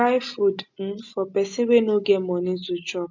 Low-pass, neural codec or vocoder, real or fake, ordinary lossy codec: 7.2 kHz; none; real; MP3, 64 kbps